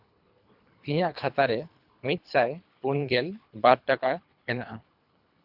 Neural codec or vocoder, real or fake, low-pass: codec, 24 kHz, 3 kbps, HILCodec; fake; 5.4 kHz